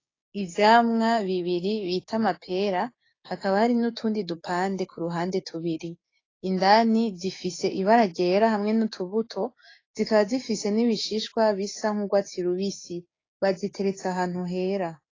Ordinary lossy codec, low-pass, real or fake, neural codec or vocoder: AAC, 32 kbps; 7.2 kHz; fake; codec, 16 kHz, 6 kbps, DAC